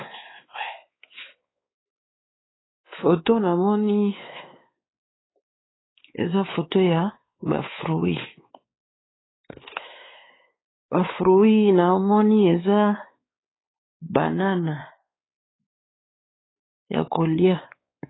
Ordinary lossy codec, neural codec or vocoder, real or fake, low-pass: AAC, 16 kbps; codec, 16 kHz, 4 kbps, X-Codec, WavLM features, trained on Multilingual LibriSpeech; fake; 7.2 kHz